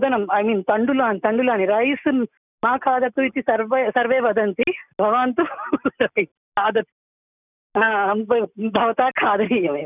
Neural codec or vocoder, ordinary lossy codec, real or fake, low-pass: none; none; real; 3.6 kHz